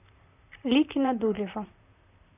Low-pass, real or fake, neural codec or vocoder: 3.6 kHz; fake; vocoder, 44.1 kHz, 128 mel bands, Pupu-Vocoder